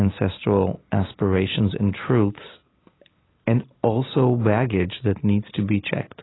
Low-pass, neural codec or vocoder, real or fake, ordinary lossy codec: 7.2 kHz; none; real; AAC, 16 kbps